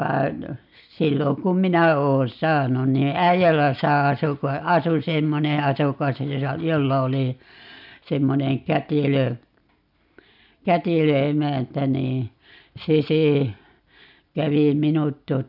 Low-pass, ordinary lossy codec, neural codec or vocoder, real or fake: 5.4 kHz; none; none; real